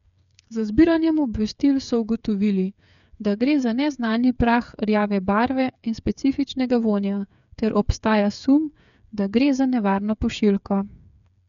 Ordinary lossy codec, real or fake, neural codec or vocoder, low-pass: none; fake; codec, 16 kHz, 8 kbps, FreqCodec, smaller model; 7.2 kHz